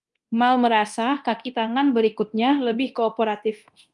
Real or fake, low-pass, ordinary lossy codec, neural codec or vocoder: fake; 10.8 kHz; Opus, 32 kbps; codec, 24 kHz, 0.9 kbps, DualCodec